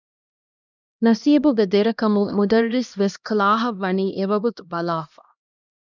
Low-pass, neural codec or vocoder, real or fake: 7.2 kHz; codec, 16 kHz, 1 kbps, X-Codec, HuBERT features, trained on LibriSpeech; fake